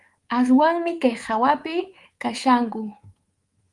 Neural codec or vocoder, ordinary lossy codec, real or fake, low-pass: codec, 24 kHz, 3.1 kbps, DualCodec; Opus, 24 kbps; fake; 10.8 kHz